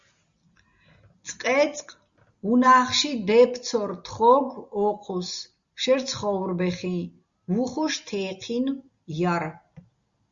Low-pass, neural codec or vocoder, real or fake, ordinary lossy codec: 7.2 kHz; none; real; Opus, 64 kbps